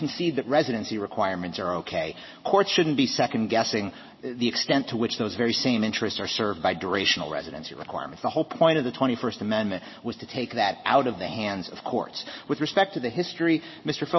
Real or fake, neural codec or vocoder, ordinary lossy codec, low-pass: real; none; MP3, 24 kbps; 7.2 kHz